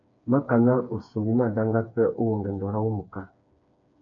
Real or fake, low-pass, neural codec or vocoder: fake; 7.2 kHz; codec, 16 kHz, 4 kbps, FreqCodec, smaller model